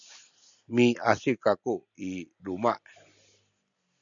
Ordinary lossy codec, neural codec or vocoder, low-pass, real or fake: MP3, 96 kbps; none; 7.2 kHz; real